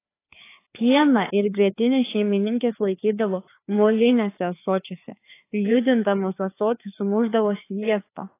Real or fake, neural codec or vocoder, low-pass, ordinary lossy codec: fake; codec, 16 kHz, 2 kbps, FreqCodec, larger model; 3.6 kHz; AAC, 24 kbps